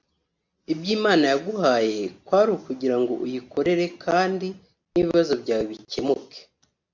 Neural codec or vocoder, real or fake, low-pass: none; real; 7.2 kHz